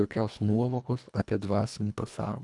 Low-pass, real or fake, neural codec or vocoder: 10.8 kHz; fake; codec, 24 kHz, 1.5 kbps, HILCodec